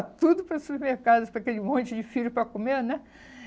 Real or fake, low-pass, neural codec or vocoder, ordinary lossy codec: real; none; none; none